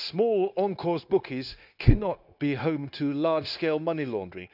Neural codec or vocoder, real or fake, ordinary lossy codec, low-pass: codec, 16 kHz, 0.9 kbps, LongCat-Audio-Codec; fake; none; 5.4 kHz